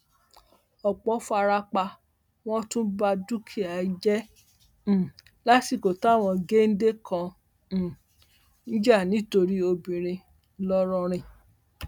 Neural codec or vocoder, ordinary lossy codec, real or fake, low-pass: none; none; real; 19.8 kHz